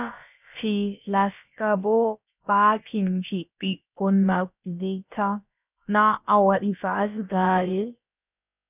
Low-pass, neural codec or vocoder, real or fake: 3.6 kHz; codec, 16 kHz, about 1 kbps, DyCAST, with the encoder's durations; fake